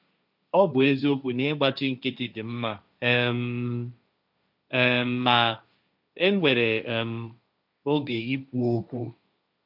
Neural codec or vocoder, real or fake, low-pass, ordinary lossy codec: codec, 16 kHz, 1.1 kbps, Voila-Tokenizer; fake; 5.4 kHz; none